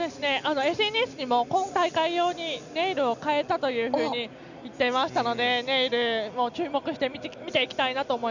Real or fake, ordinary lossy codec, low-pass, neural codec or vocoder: real; none; 7.2 kHz; none